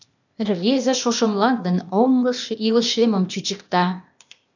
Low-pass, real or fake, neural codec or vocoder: 7.2 kHz; fake; codec, 16 kHz, 0.8 kbps, ZipCodec